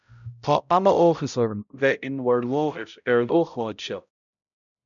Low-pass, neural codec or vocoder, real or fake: 7.2 kHz; codec, 16 kHz, 0.5 kbps, X-Codec, HuBERT features, trained on balanced general audio; fake